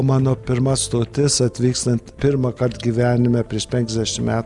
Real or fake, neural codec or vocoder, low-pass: real; none; 10.8 kHz